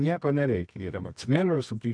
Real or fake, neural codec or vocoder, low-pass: fake; codec, 24 kHz, 0.9 kbps, WavTokenizer, medium music audio release; 9.9 kHz